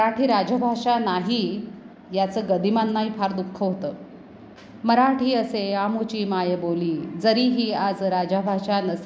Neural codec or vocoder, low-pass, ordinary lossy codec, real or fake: none; none; none; real